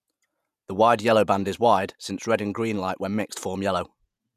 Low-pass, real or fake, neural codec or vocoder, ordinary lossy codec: 14.4 kHz; fake; vocoder, 48 kHz, 128 mel bands, Vocos; none